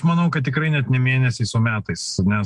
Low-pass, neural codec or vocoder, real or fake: 10.8 kHz; none; real